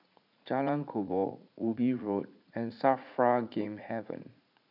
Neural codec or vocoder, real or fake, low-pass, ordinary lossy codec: vocoder, 44.1 kHz, 80 mel bands, Vocos; fake; 5.4 kHz; none